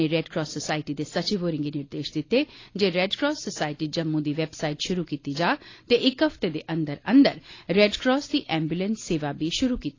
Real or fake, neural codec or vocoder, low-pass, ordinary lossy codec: real; none; 7.2 kHz; AAC, 32 kbps